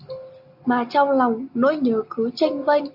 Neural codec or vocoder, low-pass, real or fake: none; 5.4 kHz; real